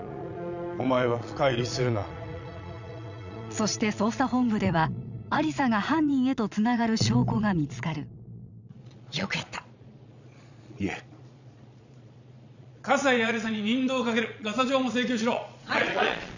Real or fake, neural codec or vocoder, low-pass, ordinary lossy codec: fake; vocoder, 22.05 kHz, 80 mel bands, Vocos; 7.2 kHz; none